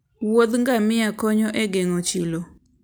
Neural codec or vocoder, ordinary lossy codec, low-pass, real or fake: none; none; none; real